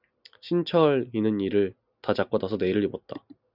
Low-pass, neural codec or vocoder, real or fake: 5.4 kHz; none; real